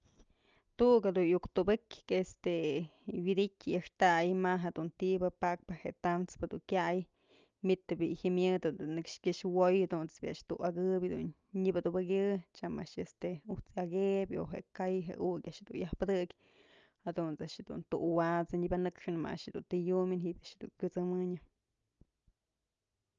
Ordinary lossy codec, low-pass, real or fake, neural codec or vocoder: Opus, 24 kbps; 7.2 kHz; real; none